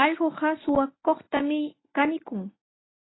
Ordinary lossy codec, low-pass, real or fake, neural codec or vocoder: AAC, 16 kbps; 7.2 kHz; real; none